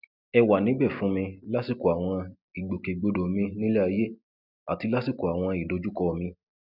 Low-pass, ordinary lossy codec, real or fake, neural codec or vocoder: 5.4 kHz; none; real; none